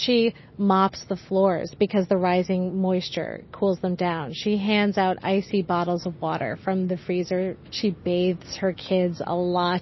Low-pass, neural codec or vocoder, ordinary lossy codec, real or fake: 7.2 kHz; none; MP3, 24 kbps; real